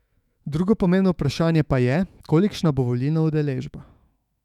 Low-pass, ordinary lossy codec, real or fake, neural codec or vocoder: 19.8 kHz; none; fake; autoencoder, 48 kHz, 128 numbers a frame, DAC-VAE, trained on Japanese speech